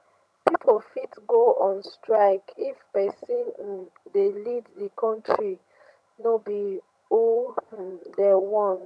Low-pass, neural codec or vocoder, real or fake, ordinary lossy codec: none; vocoder, 22.05 kHz, 80 mel bands, HiFi-GAN; fake; none